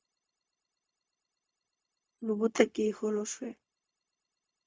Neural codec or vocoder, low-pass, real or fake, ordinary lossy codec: codec, 16 kHz, 0.4 kbps, LongCat-Audio-Codec; none; fake; none